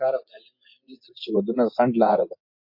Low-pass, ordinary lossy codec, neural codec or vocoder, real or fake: 5.4 kHz; MP3, 32 kbps; vocoder, 24 kHz, 100 mel bands, Vocos; fake